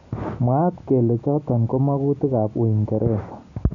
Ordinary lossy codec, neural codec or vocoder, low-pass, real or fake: none; none; 7.2 kHz; real